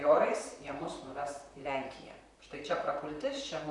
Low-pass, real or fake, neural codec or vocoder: 10.8 kHz; fake; vocoder, 44.1 kHz, 128 mel bands, Pupu-Vocoder